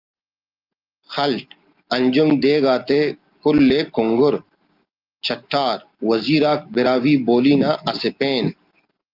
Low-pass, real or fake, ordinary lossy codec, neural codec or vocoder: 5.4 kHz; real; Opus, 24 kbps; none